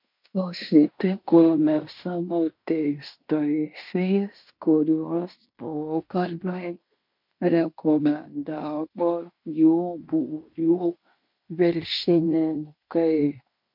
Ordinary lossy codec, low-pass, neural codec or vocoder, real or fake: MP3, 48 kbps; 5.4 kHz; codec, 16 kHz in and 24 kHz out, 0.9 kbps, LongCat-Audio-Codec, fine tuned four codebook decoder; fake